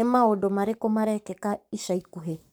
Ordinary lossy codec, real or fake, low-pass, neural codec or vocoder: none; fake; none; codec, 44.1 kHz, 7.8 kbps, Pupu-Codec